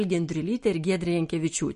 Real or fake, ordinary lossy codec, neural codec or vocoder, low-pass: real; MP3, 48 kbps; none; 10.8 kHz